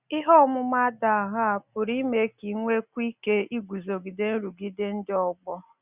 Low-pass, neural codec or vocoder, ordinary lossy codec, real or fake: 3.6 kHz; none; Opus, 64 kbps; real